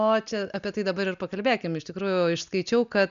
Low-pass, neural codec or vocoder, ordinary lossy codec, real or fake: 7.2 kHz; none; MP3, 96 kbps; real